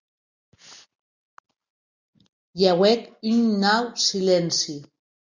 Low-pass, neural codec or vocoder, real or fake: 7.2 kHz; none; real